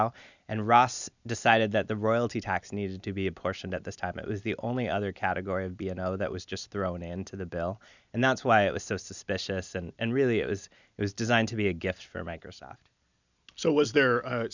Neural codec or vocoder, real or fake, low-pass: none; real; 7.2 kHz